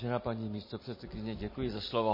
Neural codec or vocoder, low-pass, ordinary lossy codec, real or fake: vocoder, 44.1 kHz, 128 mel bands every 256 samples, BigVGAN v2; 5.4 kHz; MP3, 24 kbps; fake